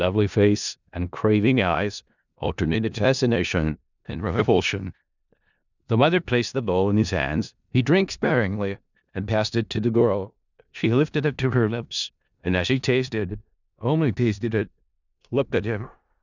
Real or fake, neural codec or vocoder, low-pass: fake; codec, 16 kHz in and 24 kHz out, 0.4 kbps, LongCat-Audio-Codec, four codebook decoder; 7.2 kHz